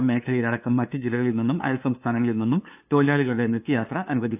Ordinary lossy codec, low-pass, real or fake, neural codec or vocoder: AAC, 32 kbps; 3.6 kHz; fake; codec, 16 kHz, 2 kbps, FunCodec, trained on LibriTTS, 25 frames a second